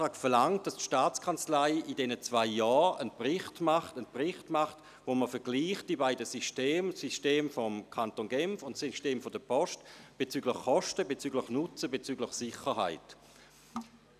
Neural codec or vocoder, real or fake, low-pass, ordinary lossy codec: none; real; 14.4 kHz; none